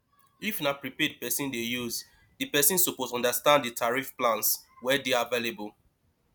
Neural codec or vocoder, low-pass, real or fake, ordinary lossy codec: none; none; real; none